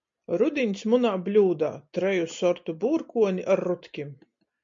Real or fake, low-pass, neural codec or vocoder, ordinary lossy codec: real; 7.2 kHz; none; MP3, 48 kbps